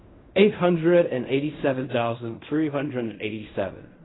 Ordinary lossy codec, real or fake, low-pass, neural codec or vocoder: AAC, 16 kbps; fake; 7.2 kHz; codec, 16 kHz in and 24 kHz out, 0.4 kbps, LongCat-Audio-Codec, fine tuned four codebook decoder